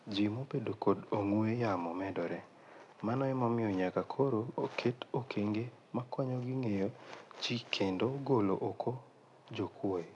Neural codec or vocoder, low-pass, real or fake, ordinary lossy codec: none; 10.8 kHz; real; none